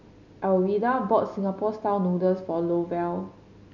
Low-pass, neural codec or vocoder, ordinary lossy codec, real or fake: 7.2 kHz; none; none; real